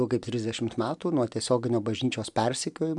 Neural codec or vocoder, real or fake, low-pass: none; real; 10.8 kHz